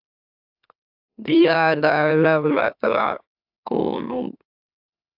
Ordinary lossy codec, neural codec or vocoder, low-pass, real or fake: AAC, 48 kbps; autoencoder, 44.1 kHz, a latent of 192 numbers a frame, MeloTTS; 5.4 kHz; fake